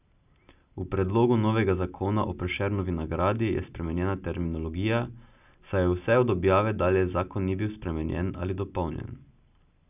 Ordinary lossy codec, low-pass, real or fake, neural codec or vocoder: none; 3.6 kHz; real; none